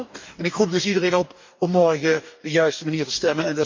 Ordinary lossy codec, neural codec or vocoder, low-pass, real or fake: MP3, 64 kbps; codec, 44.1 kHz, 2.6 kbps, DAC; 7.2 kHz; fake